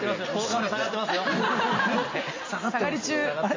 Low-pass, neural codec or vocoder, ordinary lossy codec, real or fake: 7.2 kHz; none; MP3, 32 kbps; real